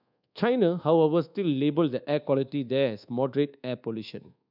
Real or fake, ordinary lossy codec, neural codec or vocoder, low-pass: fake; none; codec, 24 kHz, 1.2 kbps, DualCodec; 5.4 kHz